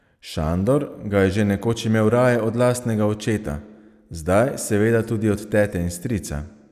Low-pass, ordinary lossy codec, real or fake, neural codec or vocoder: 14.4 kHz; none; real; none